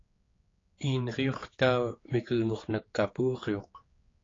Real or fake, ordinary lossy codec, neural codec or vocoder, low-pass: fake; AAC, 32 kbps; codec, 16 kHz, 4 kbps, X-Codec, HuBERT features, trained on general audio; 7.2 kHz